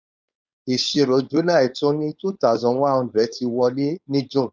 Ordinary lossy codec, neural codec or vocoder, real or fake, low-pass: none; codec, 16 kHz, 4.8 kbps, FACodec; fake; none